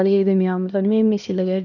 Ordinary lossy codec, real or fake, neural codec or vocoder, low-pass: none; fake; codec, 16 kHz, 1 kbps, X-Codec, HuBERT features, trained on LibriSpeech; 7.2 kHz